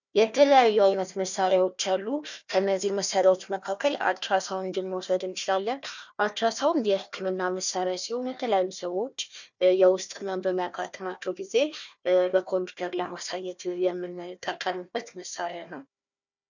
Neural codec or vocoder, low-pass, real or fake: codec, 16 kHz, 1 kbps, FunCodec, trained on Chinese and English, 50 frames a second; 7.2 kHz; fake